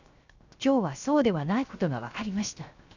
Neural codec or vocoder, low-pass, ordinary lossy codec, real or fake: codec, 16 kHz, 0.7 kbps, FocalCodec; 7.2 kHz; none; fake